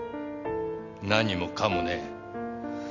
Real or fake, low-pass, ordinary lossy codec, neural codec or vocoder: real; 7.2 kHz; none; none